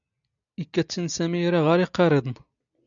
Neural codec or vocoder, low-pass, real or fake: none; 7.2 kHz; real